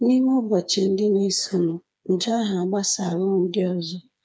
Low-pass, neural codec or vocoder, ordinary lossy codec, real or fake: none; codec, 16 kHz, 4 kbps, FreqCodec, smaller model; none; fake